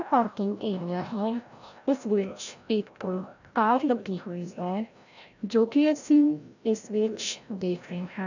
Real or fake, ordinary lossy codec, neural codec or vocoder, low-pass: fake; none; codec, 16 kHz, 0.5 kbps, FreqCodec, larger model; 7.2 kHz